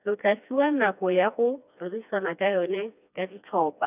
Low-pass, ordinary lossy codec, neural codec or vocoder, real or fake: 3.6 kHz; none; codec, 16 kHz, 2 kbps, FreqCodec, smaller model; fake